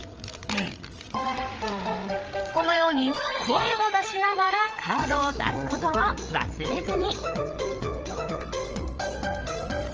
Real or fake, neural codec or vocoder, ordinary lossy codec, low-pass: fake; codec, 16 kHz, 8 kbps, FreqCodec, larger model; Opus, 24 kbps; 7.2 kHz